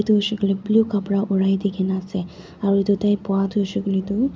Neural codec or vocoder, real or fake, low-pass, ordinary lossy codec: none; real; none; none